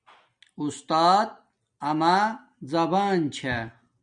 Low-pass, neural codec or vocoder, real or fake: 9.9 kHz; none; real